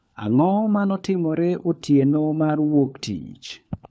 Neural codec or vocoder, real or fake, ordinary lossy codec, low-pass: codec, 16 kHz, 4 kbps, FunCodec, trained on LibriTTS, 50 frames a second; fake; none; none